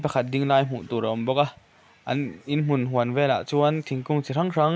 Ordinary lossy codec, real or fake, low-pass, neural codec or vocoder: none; real; none; none